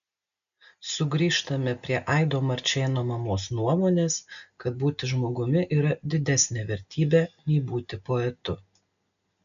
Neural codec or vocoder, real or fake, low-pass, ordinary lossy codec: none; real; 7.2 kHz; MP3, 96 kbps